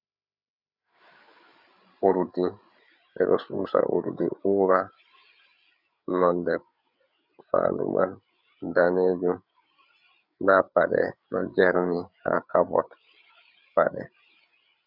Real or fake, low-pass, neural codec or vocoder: fake; 5.4 kHz; codec, 16 kHz, 8 kbps, FreqCodec, larger model